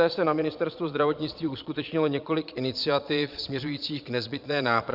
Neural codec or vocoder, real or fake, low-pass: vocoder, 22.05 kHz, 80 mel bands, Vocos; fake; 5.4 kHz